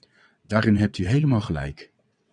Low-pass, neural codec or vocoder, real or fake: 9.9 kHz; vocoder, 22.05 kHz, 80 mel bands, WaveNeXt; fake